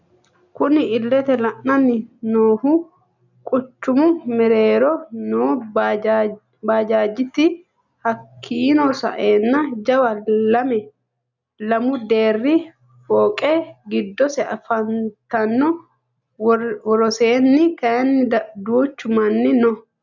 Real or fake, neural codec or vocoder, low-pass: real; none; 7.2 kHz